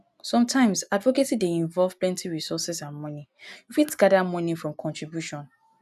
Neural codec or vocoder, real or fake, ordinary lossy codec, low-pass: none; real; none; 14.4 kHz